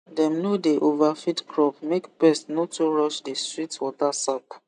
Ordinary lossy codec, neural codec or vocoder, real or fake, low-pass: none; none; real; 10.8 kHz